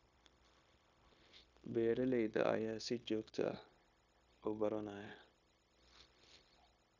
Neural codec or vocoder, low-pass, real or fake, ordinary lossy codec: codec, 16 kHz, 0.9 kbps, LongCat-Audio-Codec; 7.2 kHz; fake; none